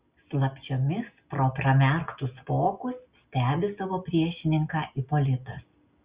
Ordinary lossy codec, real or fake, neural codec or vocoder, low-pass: Opus, 64 kbps; real; none; 3.6 kHz